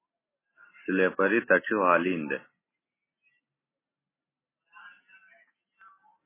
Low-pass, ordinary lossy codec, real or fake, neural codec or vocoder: 3.6 kHz; MP3, 16 kbps; real; none